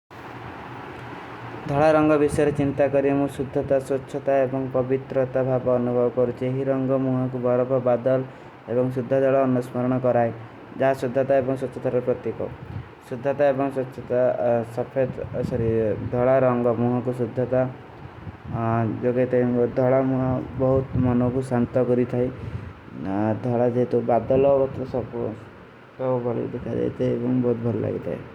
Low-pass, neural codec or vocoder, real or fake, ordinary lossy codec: 19.8 kHz; none; real; Opus, 64 kbps